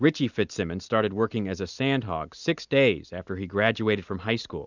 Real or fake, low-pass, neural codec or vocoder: real; 7.2 kHz; none